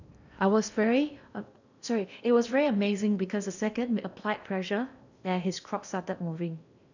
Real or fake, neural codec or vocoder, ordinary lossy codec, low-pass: fake; codec, 16 kHz in and 24 kHz out, 0.8 kbps, FocalCodec, streaming, 65536 codes; none; 7.2 kHz